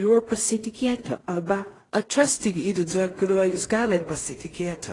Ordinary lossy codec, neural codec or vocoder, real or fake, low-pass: AAC, 32 kbps; codec, 16 kHz in and 24 kHz out, 0.4 kbps, LongCat-Audio-Codec, two codebook decoder; fake; 10.8 kHz